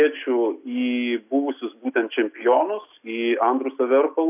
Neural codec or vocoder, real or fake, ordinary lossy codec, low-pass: none; real; AAC, 32 kbps; 3.6 kHz